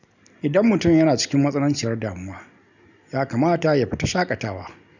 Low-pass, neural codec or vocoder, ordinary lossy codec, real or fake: 7.2 kHz; none; none; real